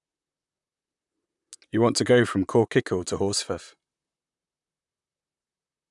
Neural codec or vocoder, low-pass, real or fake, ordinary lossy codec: vocoder, 44.1 kHz, 128 mel bands, Pupu-Vocoder; 10.8 kHz; fake; none